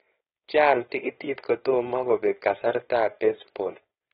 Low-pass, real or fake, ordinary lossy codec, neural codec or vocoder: 7.2 kHz; fake; AAC, 16 kbps; codec, 16 kHz, 4.8 kbps, FACodec